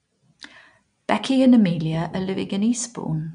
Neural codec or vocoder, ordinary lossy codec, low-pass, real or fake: none; none; 9.9 kHz; real